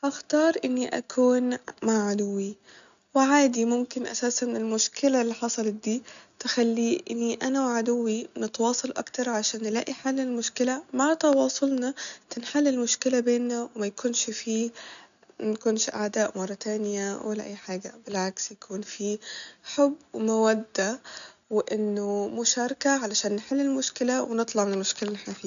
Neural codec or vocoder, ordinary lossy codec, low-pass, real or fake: none; none; 7.2 kHz; real